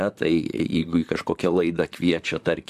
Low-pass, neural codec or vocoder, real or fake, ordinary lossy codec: 14.4 kHz; vocoder, 44.1 kHz, 128 mel bands, Pupu-Vocoder; fake; AAC, 96 kbps